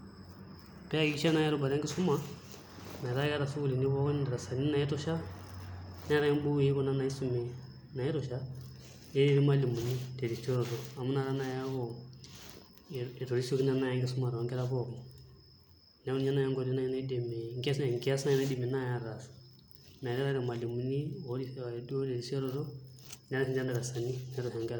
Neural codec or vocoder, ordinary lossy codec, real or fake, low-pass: none; none; real; none